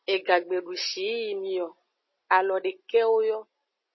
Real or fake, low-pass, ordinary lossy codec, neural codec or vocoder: real; 7.2 kHz; MP3, 24 kbps; none